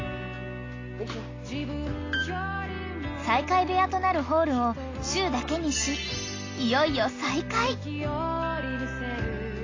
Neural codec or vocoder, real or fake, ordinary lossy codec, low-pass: none; real; AAC, 32 kbps; 7.2 kHz